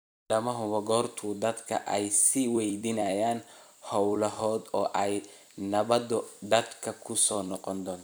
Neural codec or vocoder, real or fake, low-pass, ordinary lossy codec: vocoder, 44.1 kHz, 128 mel bands every 256 samples, BigVGAN v2; fake; none; none